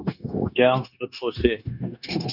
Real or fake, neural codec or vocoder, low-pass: fake; codec, 16 kHz, 0.9 kbps, LongCat-Audio-Codec; 5.4 kHz